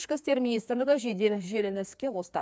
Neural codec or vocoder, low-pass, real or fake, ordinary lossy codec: codec, 16 kHz, 2 kbps, FreqCodec, larger model; none; fake; none